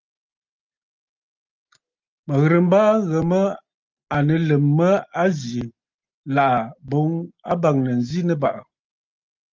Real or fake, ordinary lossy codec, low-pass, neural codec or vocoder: real; Opus, 32 kbps; 7.2 kHz; none